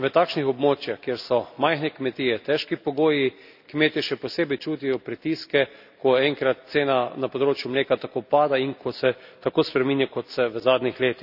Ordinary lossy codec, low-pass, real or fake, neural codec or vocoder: none; 5.4 kHz; real; none